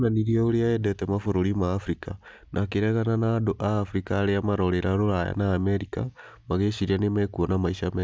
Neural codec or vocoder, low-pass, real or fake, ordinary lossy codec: none; none; real; none